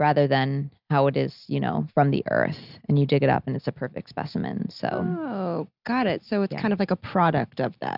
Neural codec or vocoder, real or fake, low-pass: none; real; 5.4 kHz